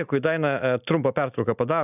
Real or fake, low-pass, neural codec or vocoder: real; 3.6 kHz; none